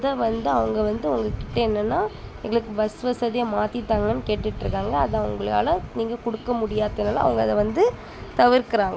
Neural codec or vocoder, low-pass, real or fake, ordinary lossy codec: none; none; real; none